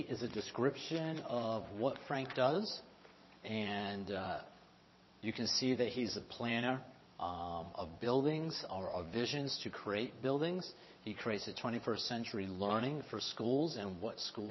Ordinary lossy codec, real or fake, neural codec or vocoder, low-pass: MP3, 24 kbps; fake; vocoder, 22.05 kHz, 80 mel bands, WaveNeXt; 7.2 kHz